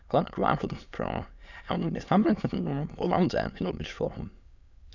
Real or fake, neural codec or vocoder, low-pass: fake; autoencoder, 22.05 kHz, a latent of 192 numbers a frame, VITS, trained on many speakers; 7.2 kHz